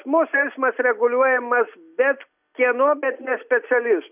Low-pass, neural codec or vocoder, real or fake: 3.6 kHz; none; real